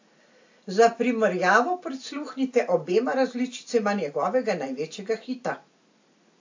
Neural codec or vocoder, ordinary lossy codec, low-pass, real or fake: none; AAC, 48 kbps; 7.2 kHz; real